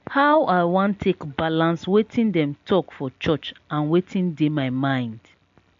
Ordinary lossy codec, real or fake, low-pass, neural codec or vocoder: AAC, 64 kbps; real; 7.2 kHz; none